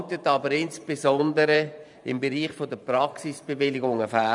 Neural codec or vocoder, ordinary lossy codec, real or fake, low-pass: vocoder, 44.1 kHz, 128 mel bands every 512 samples, BigVGAN v2; none; fake; 10.8 kHz